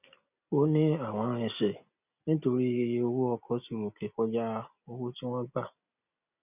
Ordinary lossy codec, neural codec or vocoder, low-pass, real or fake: none; none; 3.6 kHz; real